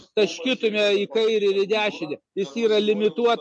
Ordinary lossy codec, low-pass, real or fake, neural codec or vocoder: MP3, 48 kbps; 10.8 kHz; real; none